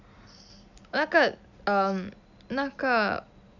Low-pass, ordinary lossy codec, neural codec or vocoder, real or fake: 7.2 kHz; none; none; real